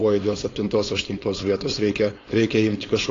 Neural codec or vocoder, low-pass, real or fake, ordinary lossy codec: codec, 16 kHz, 4.8 kbps, FACodec; 7.2 kHz; fake; AAC, 32 kbps